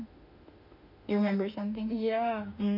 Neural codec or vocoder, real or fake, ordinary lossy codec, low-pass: autoencoder, 48 kHz, 32 numbers a frame, DAC-VAE, trained on Japanese speech; fake; none; 5.4 kHz